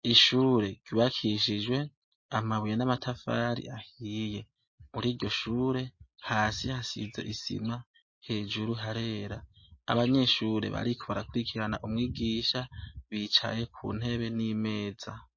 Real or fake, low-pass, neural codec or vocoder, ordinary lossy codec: real; 7.2 kHz; none; MP3, 32 kbps